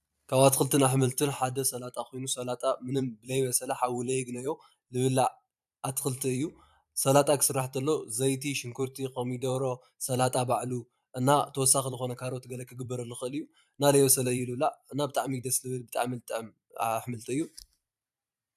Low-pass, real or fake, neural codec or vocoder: 14.4 kHz; fake; vocoder, 44.1 kHz, 128 mel bands every 512 samples, BigVGAN v2